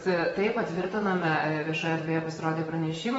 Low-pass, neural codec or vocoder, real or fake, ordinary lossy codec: 19.8 kHz; vocoder, 44.1 kHz, 128 mel bands, Pupu-Vocoder; fake; AAC, 24 kbps